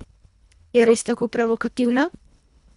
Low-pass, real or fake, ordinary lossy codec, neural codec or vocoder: 10.8 kHz; fake; none; codec, 24 kHz, 1.5 kbps, HILCodec